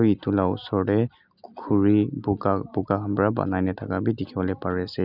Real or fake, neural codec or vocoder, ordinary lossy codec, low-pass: real; none; none; 5.4 kHz